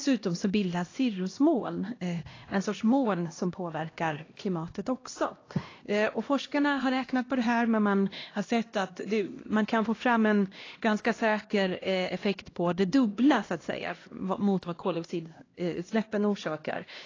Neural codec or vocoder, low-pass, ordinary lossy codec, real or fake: codec, 16 kHz, 1 kbps, X-Codec, HuBERT features, trained on LibriSpeech; 7.2 kHz; AAC, 32 kbps; fake